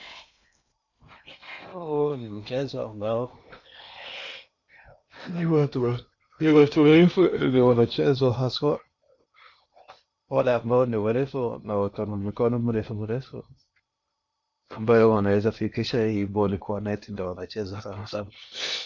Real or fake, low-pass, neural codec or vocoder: fake; 7.2 kHz; codec, 16 kHz in and 24 kHz out, 0.8 kbps, FocalCodec, streaming, 65536 codes